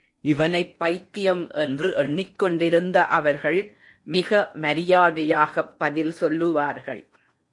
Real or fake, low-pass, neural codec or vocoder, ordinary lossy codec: fake; 10.8 kHz; codec, 16 kHz in and 24 kHz out, 0.8 kbps, FocalCodec, streaming, 65536 codes; MP3, 48 kbps